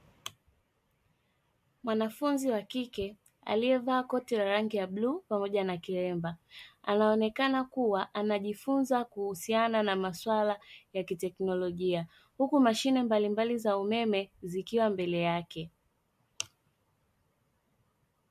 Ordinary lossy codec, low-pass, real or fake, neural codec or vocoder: MP3, 64 kbps; 14.4 kHz; real; none